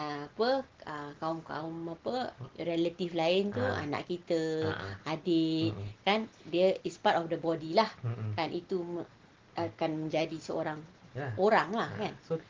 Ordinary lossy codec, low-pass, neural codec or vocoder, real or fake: Opus, 16 kbps; 7.2 kHz; none; real